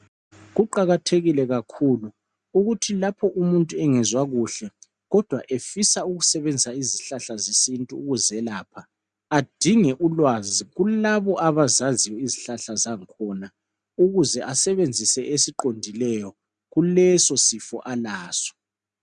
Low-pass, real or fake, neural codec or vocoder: 9.9 kHz; real; none